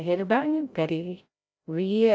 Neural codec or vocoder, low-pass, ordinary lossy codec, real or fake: codec, 16 kHz, 0.5 kbps, FreqCodec, larger model; none; none; fake